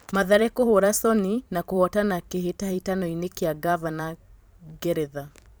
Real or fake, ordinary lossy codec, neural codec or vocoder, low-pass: fake; none; vocoder, 44.1 kHz, 128 mel bands every 512 samples, BigVGAN v2; none